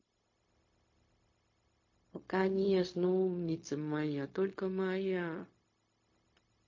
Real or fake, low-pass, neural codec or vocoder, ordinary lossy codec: fake; 7.2 kHz; codec, 16 kHz, 0.4 kbps, LongCat-Audio-Codec; MP3, 32 kbps